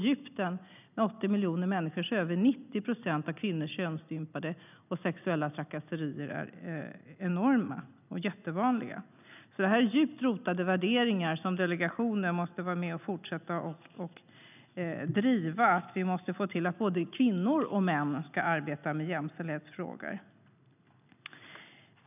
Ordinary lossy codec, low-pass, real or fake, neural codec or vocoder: none; 3.6 kHz; real; none